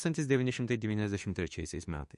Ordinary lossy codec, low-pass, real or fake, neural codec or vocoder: MP3, 48 kbps; 14.4 kHz; fake; autoencoder, 48 kHz, 32 numbers a frame, DAC-VAE, trained on Japanese speech